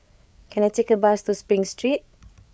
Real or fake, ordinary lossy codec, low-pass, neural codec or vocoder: fake; none; none; codec, 16 kHz, 8 kbps, FreqCodec, larger model